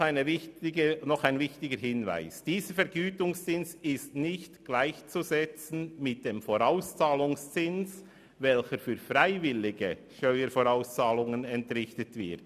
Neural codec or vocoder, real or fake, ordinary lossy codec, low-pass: none; real; none; 14.4 kHz